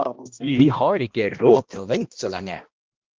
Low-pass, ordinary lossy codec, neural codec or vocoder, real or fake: 7.2 kHz; Opus, 32 kbps; codec, 16 kHz, 1 kbps, X-Codec, HuBERT features, trained on balanced general audio; fake